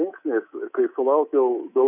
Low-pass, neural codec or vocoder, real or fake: 3.6 kHz; codec, 24 kHz, 3.1 kbps, DualCodec; fake